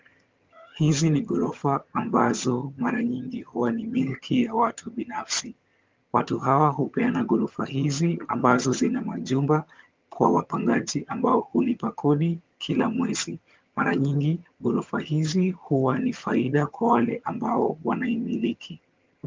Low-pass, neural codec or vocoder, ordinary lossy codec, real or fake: 7.2 kHz; vocoder, 22.05 kHz, 80 mel bands, HiFi-GAN; Opus, 32 kbps; fake